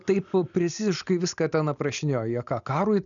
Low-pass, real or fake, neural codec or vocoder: 7.2 kHz; real; none